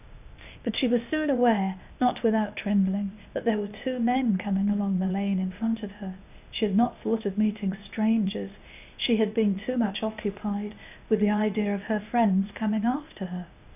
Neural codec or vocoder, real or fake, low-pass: codec, 16 kHz, 0.8 kbps, ZipCodec; fake; 3.6 kHz